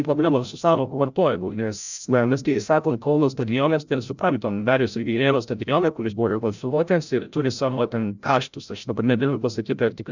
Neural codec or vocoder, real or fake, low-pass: codec, 16 kHz, 0.5 kbps, FreqCodec, larger model; fake; 7.2 kHz